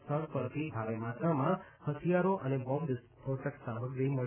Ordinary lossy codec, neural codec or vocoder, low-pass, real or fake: none; none; 3.6 kHz; real